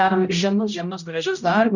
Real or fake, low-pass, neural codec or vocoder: fake; 7.2 kHz; codec, 16 kHz, 0.5 kbps, X-Codec, HuBERT features, trained on general audio